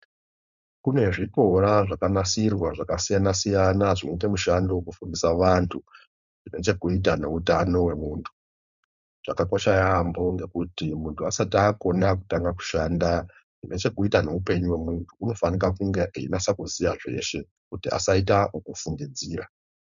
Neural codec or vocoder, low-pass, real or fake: codec, 16 kHz, 4.8 kbps, FACodec; 7.2 kHz; fake